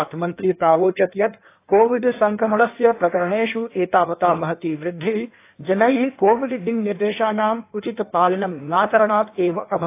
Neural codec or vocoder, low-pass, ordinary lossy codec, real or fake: codec, 16 kHz in and 24 kHz out, 1.1 kbps, FireRedTTS-2 codec; 3.6 kHz; AAC, 24 kbps; fake